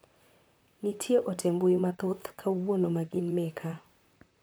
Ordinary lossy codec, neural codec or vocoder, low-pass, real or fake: none; vocoder, 44.1 kHz, 128 mel bands, Pupu-Vocoder; none; fake